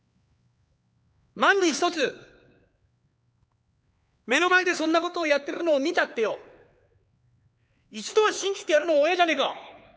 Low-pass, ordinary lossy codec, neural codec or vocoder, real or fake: none; none; codec, 16 kHz, 4 kbps, X-Codec, HuBERT features, trained on LibriSpeech; fake